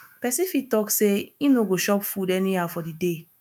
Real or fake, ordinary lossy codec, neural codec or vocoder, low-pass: fake; none; autoencoder, 48 kHz, 128 numbers a frame, DAC-VAE, trained on Japanese speech; none